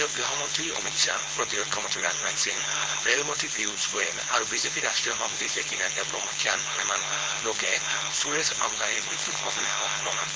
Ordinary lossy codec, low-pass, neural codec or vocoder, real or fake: none; none; codec, 16 kHz, 4.8 kbps, FACodec; fake